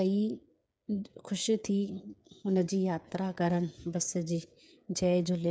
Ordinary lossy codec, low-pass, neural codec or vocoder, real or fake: none; none; codec, 16 kHz, 8 kbps, FreqCodec, smaller model; fake